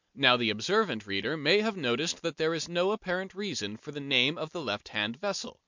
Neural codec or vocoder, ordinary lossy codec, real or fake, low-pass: none; MP3, 64 kbps; real; 7.2 kHz